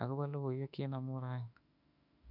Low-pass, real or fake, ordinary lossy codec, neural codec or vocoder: 5.4 kHz; fake; MP3, 48 kbps; autoencoder, 48 kHz, 32 numbers a frame, DAC-VAE, trained on Japanese speech